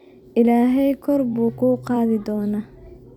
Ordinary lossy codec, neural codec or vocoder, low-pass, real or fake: none; vocoder, 44.1 kHz, 128 mel bands every 256 samples, BigVGAN v2; 19.8 kHz; fake